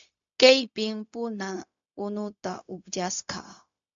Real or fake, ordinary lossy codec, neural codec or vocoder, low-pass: fake; AAC, 64 kbps; codec, 16 kHz, 0.4 kbps, LongCat-Audio-Codec; 7.2 kHz